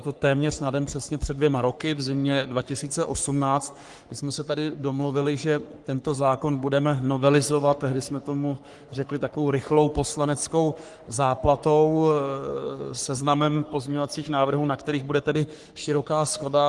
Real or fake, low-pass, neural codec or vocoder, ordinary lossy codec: fake; 10.8 kHz; codec, 44.1 kHz, 3.4 kbps, Pupu-Codec; Opus, 24 kbps